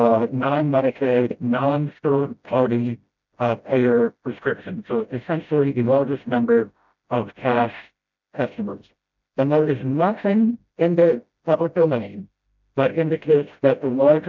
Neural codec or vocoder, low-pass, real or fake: codec, 16 kHz, 0.5 kbps, FreqCodec, smaller model; 7.2 kHz; fake